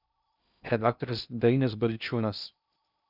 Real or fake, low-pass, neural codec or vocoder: fake; 5.4 kHz; codec, 16 kHz in and 24 kHz out, 0.6 kbps, FocalCodec, streaming, 2048 codes